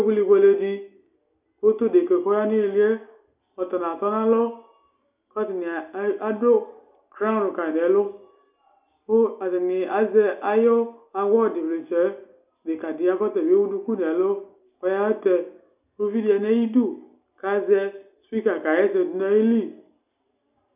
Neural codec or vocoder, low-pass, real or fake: none; 3.6 kHz; real